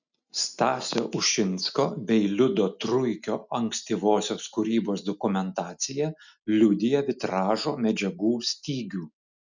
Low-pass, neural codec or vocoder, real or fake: 7.2 kHz; none; real